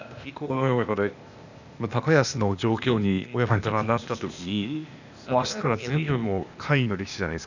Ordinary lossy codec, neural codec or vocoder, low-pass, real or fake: none; codec, 16 kHz, 0.8 kbps, ZipCodec; 7.2 kHz; fake